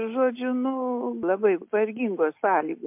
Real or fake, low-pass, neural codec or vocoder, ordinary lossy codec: real; 3.6 kHz; none; MP3, 32 kbps